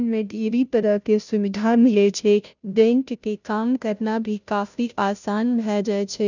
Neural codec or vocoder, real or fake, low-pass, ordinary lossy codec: codec, 16 kHz, 0.5 kbps, FunCodec, trained on Chinese and English, 25 frames a second; fake; 7.2 kHz; none